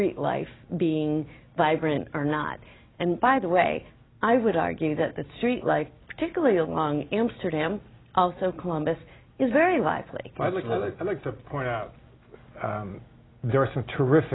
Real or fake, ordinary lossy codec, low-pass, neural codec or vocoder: real; AAC, 16 kbps; 7.2 kHz; none